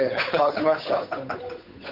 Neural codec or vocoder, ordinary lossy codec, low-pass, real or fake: codec, 16 kHz, 8 kbps, FunCodec, trained on Chinese and English, 25 frames a second; none; 5.4 kHz; fake